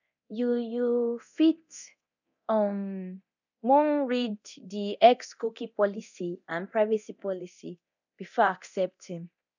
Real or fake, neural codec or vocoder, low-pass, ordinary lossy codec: fake; codec, 24 kHz, 0.9 kbps, DualCodec; 7.2 kHz; none